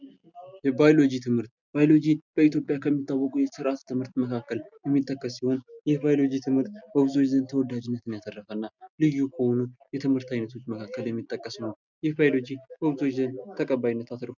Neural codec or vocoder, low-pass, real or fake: none; 7.2 kHz; real